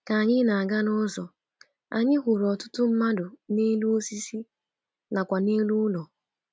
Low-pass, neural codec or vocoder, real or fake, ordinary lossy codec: none; none; real; none